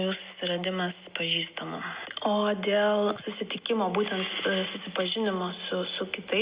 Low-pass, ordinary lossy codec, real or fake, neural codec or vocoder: 3.6 kHz; Opus, 64 kbps; real; none